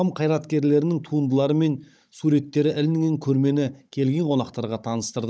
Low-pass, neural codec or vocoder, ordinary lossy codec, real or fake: none; codec, 16 kHz, 16 kbps, FunCodec, trained on Chinese and English, 50 frames a second; none; fake